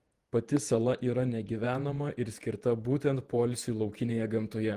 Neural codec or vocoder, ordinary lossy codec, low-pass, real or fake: vocoder, 48 kHz, 128 mel bands, Vocos; Opus, 24 kbps; 14.4 kHz; fake